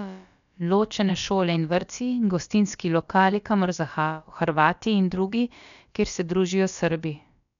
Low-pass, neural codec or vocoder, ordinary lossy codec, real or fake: 7.2 kHz; codec, 16 kHz, about 1 kbps, DyCAST, with the encoder's durations; none; fake